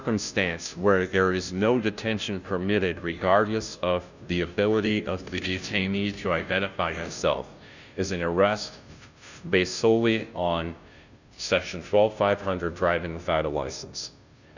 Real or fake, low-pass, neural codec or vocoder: fake; 7.2 kHz; codec, 16 kHz, 0.5 kbps, FunCodec, trained on Chinese and English, 25 frames a second